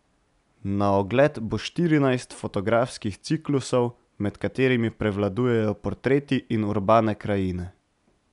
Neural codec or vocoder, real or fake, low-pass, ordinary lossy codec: none; real; 10.8 kHz; none